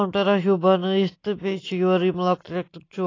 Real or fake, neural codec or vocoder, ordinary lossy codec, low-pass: real; none; AAC, 32 kbps; 7.2 kHz